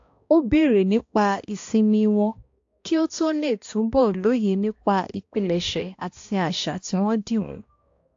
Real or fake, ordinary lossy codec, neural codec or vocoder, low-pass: fake; AAC, 48 kbps; codec, 16 kHz, 1 kbps, X-Codec, HuBERT features, trained on balanced general audio; 7.2 kHz